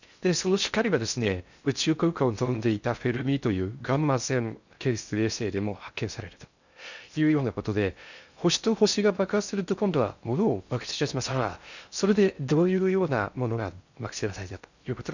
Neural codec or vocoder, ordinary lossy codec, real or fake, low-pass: codec, 16 kHz in and 24 kHz out, 0.6 kbps, FocalCodec, streaming, 4096 codes; none; fake; 7.2 kHz